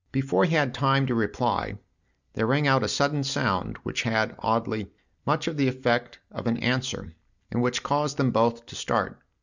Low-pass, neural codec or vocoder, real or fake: 7.2 kHz; none; real